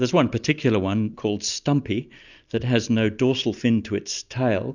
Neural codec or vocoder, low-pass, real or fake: none; 7.2 kHz; real